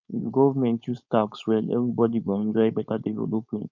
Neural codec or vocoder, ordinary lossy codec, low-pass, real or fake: codec, 16 kHz, 4.8 kbps, FACodec; none; 7.2 kHz; fake